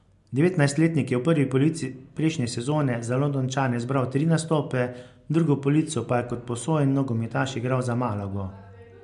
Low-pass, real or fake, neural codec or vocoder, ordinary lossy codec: 10.8 kHz; real; none; MP3, 64 kbps